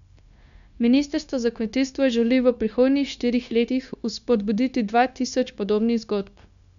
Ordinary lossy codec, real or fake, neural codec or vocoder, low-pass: none; fake; codec, 16 kHz, 0.9 kbps, LongCat-Audio-Codec; 7.2 kHz